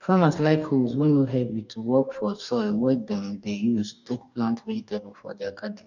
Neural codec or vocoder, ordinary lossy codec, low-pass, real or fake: codec, 44.1 kHz, 2.6 kbps, DAC; none; 7.2 kHz; fake